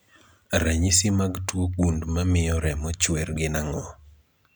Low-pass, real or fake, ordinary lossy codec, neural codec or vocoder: none; real; none; none